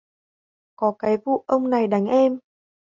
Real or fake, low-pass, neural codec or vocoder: real; 7.2 kHz; none